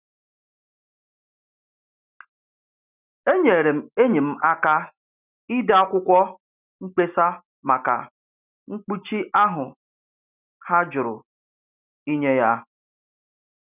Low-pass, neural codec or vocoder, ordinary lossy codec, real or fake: 3.6 kHz; none; none; real